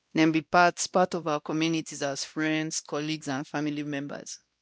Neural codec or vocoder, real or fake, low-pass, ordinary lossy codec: codec, 16 kHz, 1 kbps, X-Codec, WavLM features, trained on Multilingual LibriSpeech; fake; none; none